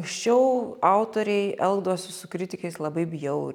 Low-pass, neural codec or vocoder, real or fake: 19.8 kHz; none; real